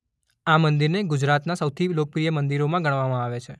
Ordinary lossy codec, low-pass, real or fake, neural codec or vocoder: none; none; real; none